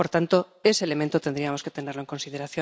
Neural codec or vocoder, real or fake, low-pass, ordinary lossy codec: none; real; none; none